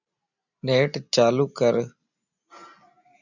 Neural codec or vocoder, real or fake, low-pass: none; real; 7.2 kHz